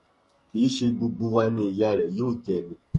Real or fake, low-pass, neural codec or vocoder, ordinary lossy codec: fake; 14.4 kHz; codec, 44.1 kHz, 2.6 kbps, SNAC; MP3, 48 kbps